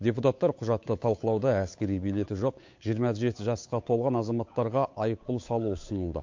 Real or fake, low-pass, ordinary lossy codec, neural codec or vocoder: fake; 7.2 kHz; MP3, 48 kbps; codec, 16 kHz, 8 kbps, FunCodec, trained on Chinese and English, 25 frames a second